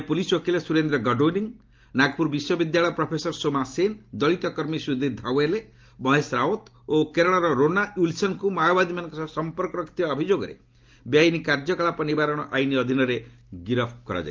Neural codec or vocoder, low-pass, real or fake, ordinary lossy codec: none; 7.2 kHz; real; Opus, 32 kbps